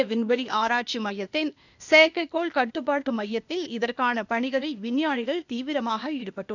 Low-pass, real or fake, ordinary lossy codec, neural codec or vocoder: 7.2 kHz; fake; none; codec, 16 kHz, 0.8 kbps, ZipCodec